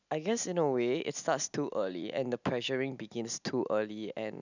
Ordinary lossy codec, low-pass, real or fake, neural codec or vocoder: none; 7.2 kHz; real; none